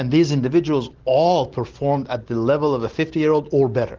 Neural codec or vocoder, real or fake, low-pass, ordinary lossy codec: none; real; 7.2 kHz; Opus, 16 kbps